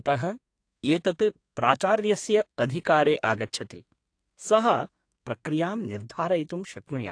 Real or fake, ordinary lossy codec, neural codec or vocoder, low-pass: fake; AAC, 48 kbps; codec, 32 kHz, 1.9 kbps, SNAC; 9.9 kHz